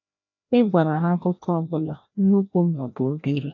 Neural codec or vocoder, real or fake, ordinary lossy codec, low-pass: codec, 16 kHz, 1 kbps, FreqCodec, larger model; fake; AAC, 48 kbps; 7.2 kHz